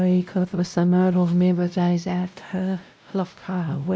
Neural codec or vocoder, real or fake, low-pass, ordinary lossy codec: codec, 16 kHz, 0.5 kbps, X-Codec, WavLM features, trained on Multilingual LibriSpeech; fake; none; none